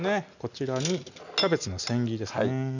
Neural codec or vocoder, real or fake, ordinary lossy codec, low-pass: none; real; none; 7.2 kHz